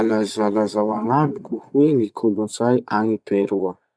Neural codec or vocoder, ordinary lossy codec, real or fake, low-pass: vocoder, 22.05 kHz, 80 mel bands, Vocos; none; fake; none